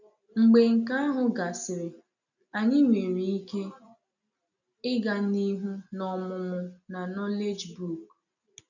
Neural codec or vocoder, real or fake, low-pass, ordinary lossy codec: none; real; 7.2 kHz; none